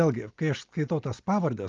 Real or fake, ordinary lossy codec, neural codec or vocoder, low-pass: real; Opus, 24 kbps; none; 7.2 kHz